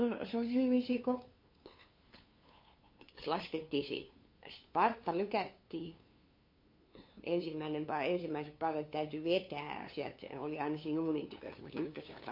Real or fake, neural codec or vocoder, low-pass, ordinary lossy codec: fake; codec, 16 kHz, 2 kbps, FunCodec, trained on LibriTTS, 25 frames a second; 5.4 kHz; MP3, 32 kbps